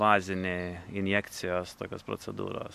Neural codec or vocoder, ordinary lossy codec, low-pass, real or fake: none; MP3, 96 kbps; 14.4 kHz; real